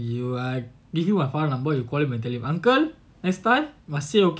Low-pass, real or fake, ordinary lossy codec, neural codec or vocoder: none; real; none; none